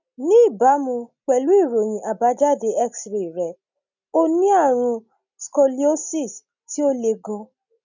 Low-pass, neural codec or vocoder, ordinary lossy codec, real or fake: 7.2 kHz; none; none; real